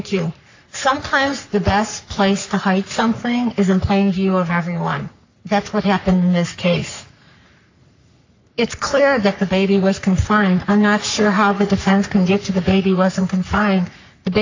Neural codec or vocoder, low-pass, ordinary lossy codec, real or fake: codec, 44.1 kHz, 3.4 kbps, Pupu-Codec; 7.2 kHz; AAC, 48 kbps; fake